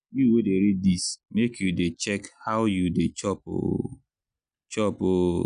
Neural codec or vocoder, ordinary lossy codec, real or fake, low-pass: none; none; real; 9.9 kHz